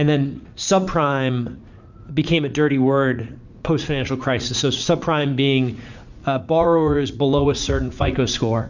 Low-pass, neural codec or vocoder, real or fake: 7.2 kHz; vocoder, 44.1 kHz, 80 mel bands, Vocos; fake